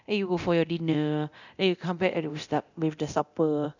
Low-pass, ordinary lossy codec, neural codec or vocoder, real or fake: 7.2 kHz; none; codec, 24 kHz, 0.9 kbps, DualCodec; fake